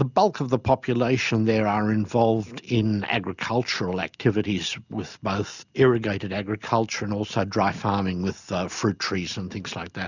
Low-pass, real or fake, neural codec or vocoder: 7.2 kHz; real; none